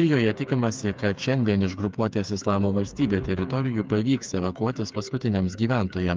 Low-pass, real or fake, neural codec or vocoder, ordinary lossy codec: 7.2 kHz; fake; codec, 16 kHz, 4 kbps, FreqCodec, smaller model; Opus, 24 kbps